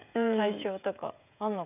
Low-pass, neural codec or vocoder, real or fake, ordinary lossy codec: 3.6 kHz; codec, 16 kHz, 16 kbps, FreqCodec, smaller model; fake; none